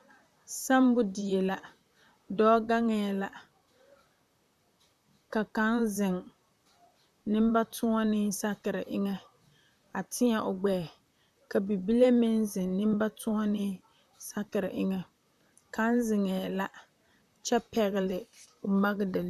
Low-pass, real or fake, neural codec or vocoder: 14.4 kHz; fake; vocoder, 44.1 kHz, 128 mel bands, Pupu-Vocoder